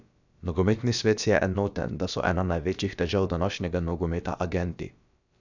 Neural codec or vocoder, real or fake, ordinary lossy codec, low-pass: codec, 16 kHz, about 1 kbps, DyCAST, with the encoder's durations; fake; none; 7.2 kHz